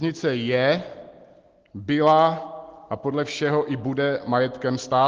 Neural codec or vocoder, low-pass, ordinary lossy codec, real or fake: none; 7.2 kHz; Opus, 16 kbps; real